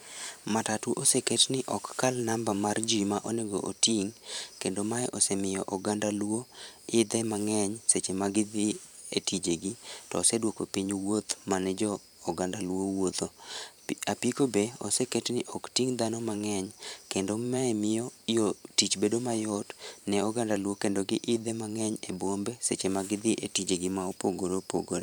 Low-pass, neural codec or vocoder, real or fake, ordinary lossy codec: none; vocoder, 44.1 kHz, 128 mel bands every 512 samples, BigVGAN v2; fake; none